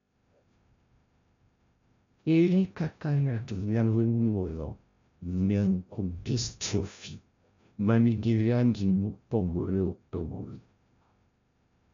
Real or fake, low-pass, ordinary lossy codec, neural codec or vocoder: fake; 7.2 kHz; MP3, 64 kbps; codec, 16 kHz, 0.5 kbps, FreqCodec, larger model